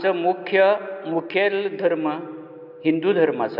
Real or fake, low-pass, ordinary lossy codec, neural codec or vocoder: real; 5.4 kHz; AAC, 48 kbps; none